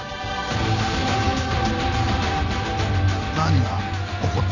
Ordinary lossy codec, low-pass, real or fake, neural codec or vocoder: none; 7.2 kHz; real; none